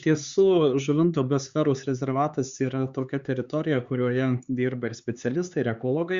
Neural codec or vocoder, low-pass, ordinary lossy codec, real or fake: codec, 16 kHz, 4 kbps, X-Codec, HuBERT features, trained on LibriSpeech; 7.2 kHz; Opus, 64 kbps; fake